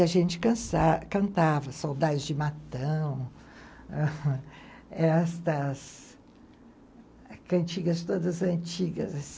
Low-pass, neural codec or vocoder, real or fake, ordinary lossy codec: none; none; real; none